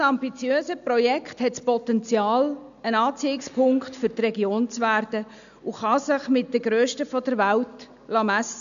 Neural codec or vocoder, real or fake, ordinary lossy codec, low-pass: none; real; none; 7.2 kHz